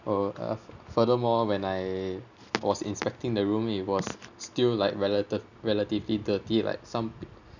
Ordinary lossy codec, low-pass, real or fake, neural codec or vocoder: none; 7.2 kHz; real; none